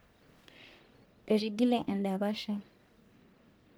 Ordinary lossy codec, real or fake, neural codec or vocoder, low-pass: none; fake; codec, 44.1 kHz, 1.7 kbps, Pupu-Codec; none